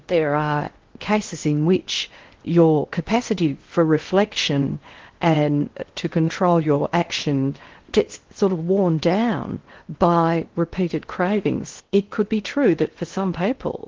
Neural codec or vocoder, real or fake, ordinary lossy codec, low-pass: codec, 16 kHz in and 24 kHz out, 0.6 kbps, FocalCodec, streaming, 4096 codes; fake; Opus, 16 kbps; 7.2 kHz